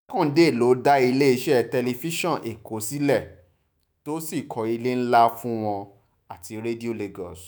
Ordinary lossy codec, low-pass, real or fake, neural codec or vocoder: none; none; fake; autoencoder, 48 kHz, 128 numbers a frame, DAC-VAE, trained on Japanese speech